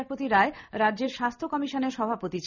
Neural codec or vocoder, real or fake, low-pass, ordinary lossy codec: none; real; 7.2 kHz; none